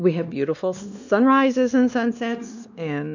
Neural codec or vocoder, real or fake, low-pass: codec, 16 kHz, 2 kbps, X-Codec, WavLM features, trained on Multilingual LibriSpeech; fake; 7.2 kHz